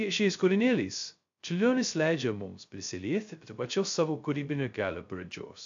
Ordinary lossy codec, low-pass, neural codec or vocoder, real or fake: AAC, 64 kbps; 7.2 kHz; codec, 16 kHz, 0.2 kbps, FocalCodec; fake